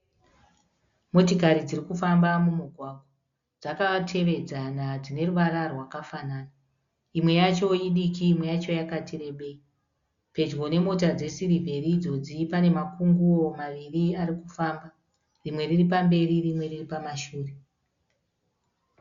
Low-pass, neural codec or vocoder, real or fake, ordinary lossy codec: 7.2 kHz; none; real; Opus, 64 kbps